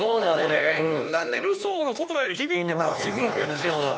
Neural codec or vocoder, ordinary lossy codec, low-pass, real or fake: codec, 16 kHz, 2 kbps, X-Codec, HuBERT features, trained on LibriSpeech; none; none; fake